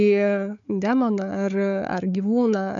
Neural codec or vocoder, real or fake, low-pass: codec, 16 kHz, 4 kbps, FunCodec, trained on Chinese and English, 50 frames a second; fake; 7.2 kHz